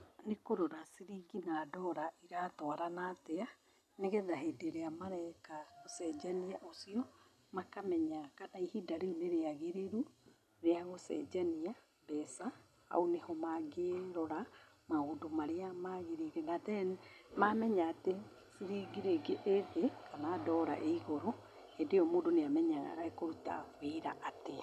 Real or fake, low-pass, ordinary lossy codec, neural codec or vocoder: real; 14.4 kHz; none; none